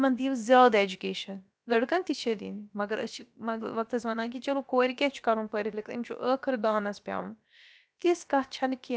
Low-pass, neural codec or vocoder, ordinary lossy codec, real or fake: none; codec, 16 kHz, about 1 kbps, DyCAST, with the encoder's durations; none; fake